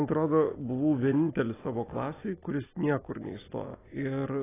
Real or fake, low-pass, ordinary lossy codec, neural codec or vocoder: real; 3.6 kHz; AAC, 16 kbps; none